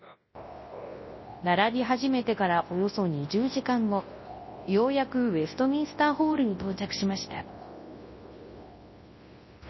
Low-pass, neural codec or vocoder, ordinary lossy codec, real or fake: 7.2 kHz; codec, 24 kHz, 0.9 kbps, WavTokenizer, large speech release; MP3, 24 kbps; fake